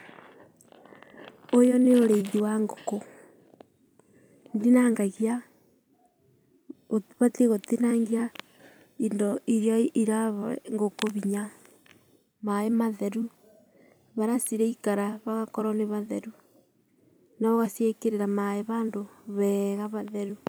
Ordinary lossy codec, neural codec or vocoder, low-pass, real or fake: none; vocoder, 44.1 kHz, 128 mel bands every 256 samples, BigVGAN v2; none; fake